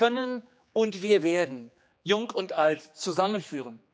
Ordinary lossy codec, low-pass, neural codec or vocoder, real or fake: none; none; codec, 16 kHz, 2 kbps, X-Codec, HuBERT features, trained on general audio; fake